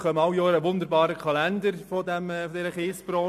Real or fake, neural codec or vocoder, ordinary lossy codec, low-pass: real; none; MP3, 64 kbps; 14.4 kHz